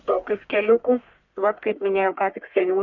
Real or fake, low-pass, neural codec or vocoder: fake; 7.2 kHz; codec, 44.1 kHz, 1.7 kbps, Pupu-Codec